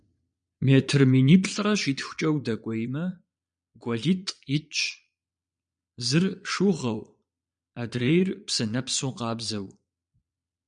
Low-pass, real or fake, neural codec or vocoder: 9.9 kHz; fake; vocoder, 22.05 kHz, 80 mel bands, Vocos